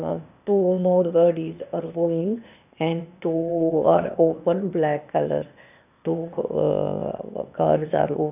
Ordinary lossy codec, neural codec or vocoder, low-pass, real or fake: none; codec, 16 kHz, 0.8 kbps, ZipCodec; 3.6 kHz; fake